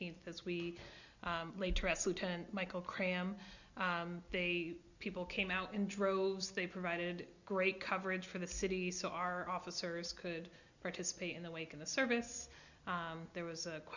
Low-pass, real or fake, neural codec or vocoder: 7.2 kHz; real; none